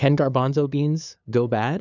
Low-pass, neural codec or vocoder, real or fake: 7.2 kHz; codec, 16 kHz, 2 kbps, FunCodec, trained on LibriTTS, 25 frames a second; fake